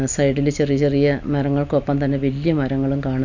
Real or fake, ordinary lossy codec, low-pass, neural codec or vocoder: real; none; 7.2 kHz; none